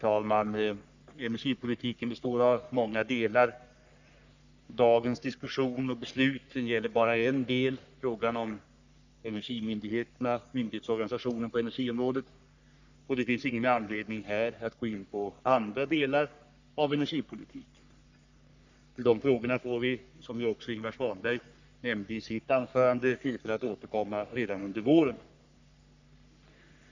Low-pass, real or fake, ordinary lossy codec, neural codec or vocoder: 7.2 kHz; fake; none; codec, 44.1 kHz, 3.4 kbps, Pupu-Codec